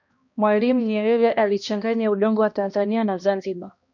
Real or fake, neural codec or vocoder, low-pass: fake; codec, 16 kHz, 1 kbps, X-Codec, HuBERT features, trained on balanced general audio; 7.2 kHz